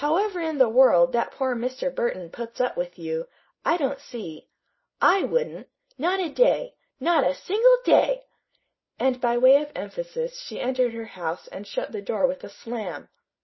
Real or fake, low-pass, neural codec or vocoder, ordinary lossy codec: real; 7.2 kHz; none; MP3, 24 kbps